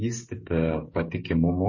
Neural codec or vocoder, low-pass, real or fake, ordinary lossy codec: codec, 16 kHz, 16 kbps, FreqCodec, smaller model; 7.2 kHz; fake; MP3, 32 kbps